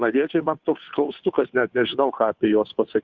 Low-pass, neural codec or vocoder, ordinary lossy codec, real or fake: 7.2 kHz; codec, 16 kHz, 2 kbps, FunCodec, trained on Chinese and English, 25 frames a second; Opus, 64 kbps; fake